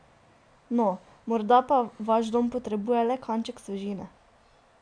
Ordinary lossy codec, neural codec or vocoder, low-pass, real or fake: AAC, 64 kbps; none; 9.9 kHz; real